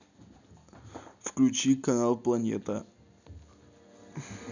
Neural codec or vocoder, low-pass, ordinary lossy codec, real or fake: none; 7.2 kHz; none; real